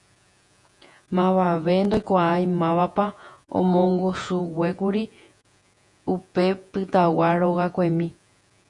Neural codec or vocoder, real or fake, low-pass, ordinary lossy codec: vocoder, 48 kHz, 128 mel bands, Vocos; fake; 10.8 kHz; MP3, 96 kbps